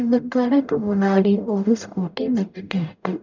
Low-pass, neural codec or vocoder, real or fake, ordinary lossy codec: 7.2 kHz; codec, 44.1 kHz, 0.9 kbps, DAC; fake; none